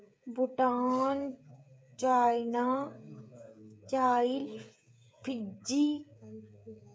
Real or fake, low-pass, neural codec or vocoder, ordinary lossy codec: fake; none; codec, 16 kHz, 16 kbps, FreqCodec, smaller model; none